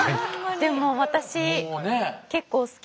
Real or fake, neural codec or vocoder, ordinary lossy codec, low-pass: real; none; none; none